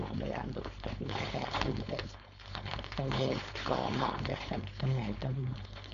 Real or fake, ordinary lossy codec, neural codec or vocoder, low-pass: fake; MP3, 96 kbps; codec, 16 kHz, 4.8 kbps, FACodec; 7.2 kHz